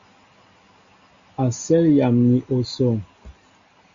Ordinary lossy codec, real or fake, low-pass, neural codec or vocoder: Opus, 64 kbps; real; 7.2 kHz; none